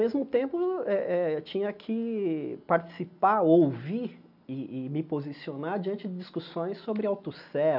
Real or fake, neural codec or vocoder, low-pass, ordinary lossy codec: real; none; 5.4 kHz; none